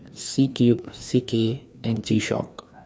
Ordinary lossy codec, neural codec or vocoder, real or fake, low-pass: none; codec, 16 kHz, 2 kbps, FreqCodec, larger model; fake; none